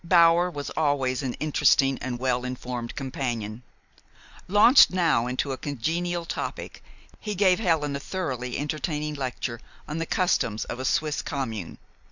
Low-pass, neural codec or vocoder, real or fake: 7.2 kHz; none; real